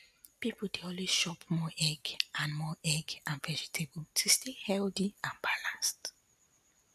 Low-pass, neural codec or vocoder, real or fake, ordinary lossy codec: 14.4 kHz; none; real; none